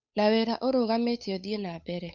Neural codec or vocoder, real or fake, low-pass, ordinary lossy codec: codec, 16 kHz, 8 kbps, FunCodec, trained on Chinese and English, 25 frames a second; fake; 7.2 kHz; none